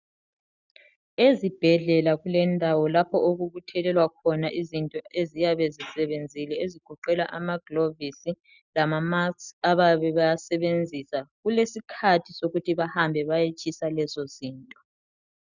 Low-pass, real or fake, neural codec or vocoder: 7.2 kHz; real; none